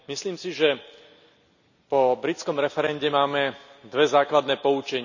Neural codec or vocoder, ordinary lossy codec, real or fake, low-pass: none; none; real; 7.2 kHz